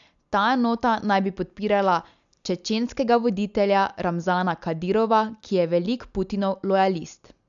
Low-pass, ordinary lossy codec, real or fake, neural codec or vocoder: 7.2 kHz; none; real; none